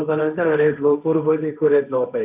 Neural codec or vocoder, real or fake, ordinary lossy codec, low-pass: codec, 16 kHz, 1.1 kbps, Voila-Tokenizer; fake; none; 3.6 kHz